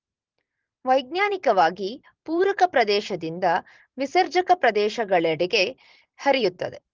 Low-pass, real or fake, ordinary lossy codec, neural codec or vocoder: 7.2 kHz; fake; Opus, 16 kbps; vocoder, 44.1 kHz, 128 mel bands every 512 samples, BigVGAN v2